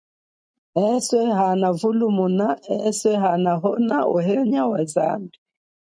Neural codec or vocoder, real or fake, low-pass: none; real; 9.9 kHz